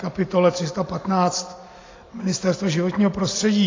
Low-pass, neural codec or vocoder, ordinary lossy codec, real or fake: 7.2 kHz; none; AAC, 32 kbps; real